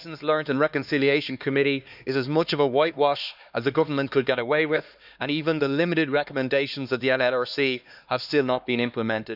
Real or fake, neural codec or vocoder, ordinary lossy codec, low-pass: fake; codec, 16 kHz, 2 kbps, X-Codec, HuBERT features, trained on LibriSpeech; none; 5.4 kHz